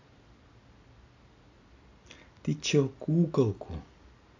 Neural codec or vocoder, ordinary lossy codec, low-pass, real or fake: none; AAC, 48 kbps; 7.2 kHz; real